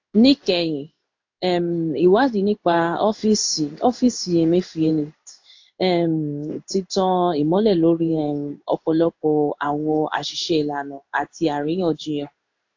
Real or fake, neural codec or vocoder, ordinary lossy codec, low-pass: fake; codec, 16 kHz in and 24 kHz out, 1 kbps, XY-Tokenizer; AAC, 48 kbps; 7.2 kHz